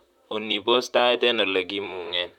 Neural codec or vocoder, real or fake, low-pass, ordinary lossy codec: vocoder, 44.1 kHz, 128 mel bands, Pupu-Vocoder; fake; 19.8 kHz; none